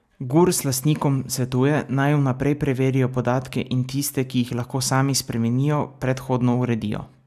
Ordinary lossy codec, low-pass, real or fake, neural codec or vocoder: none; 14.4 kHz; real; none